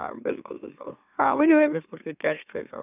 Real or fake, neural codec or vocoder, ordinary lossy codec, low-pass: fake; autoencoder, 44.1 kHz, a latent of 192 numbers a frame, MeloTTS; none; 3.6 kHz